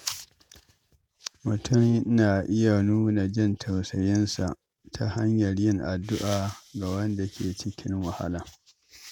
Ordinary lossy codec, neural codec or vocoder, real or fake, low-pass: none; vocoder, 48 kHz, 128 mel bands, Vocos; fake; none